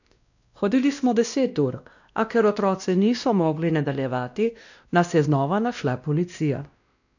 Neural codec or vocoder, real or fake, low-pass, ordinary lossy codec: codec, 16 kHz, 1 kbps, X-Codec, WavLM features, trained on Multilingual LibriSpeech; fake; 7.2 kHz; none